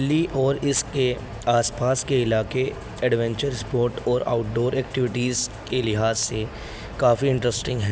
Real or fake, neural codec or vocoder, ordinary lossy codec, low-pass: real; none; none; none